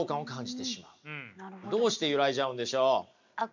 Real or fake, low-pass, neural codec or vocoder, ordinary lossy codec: real; 7.2 kHz; none; MP3, 64 kbps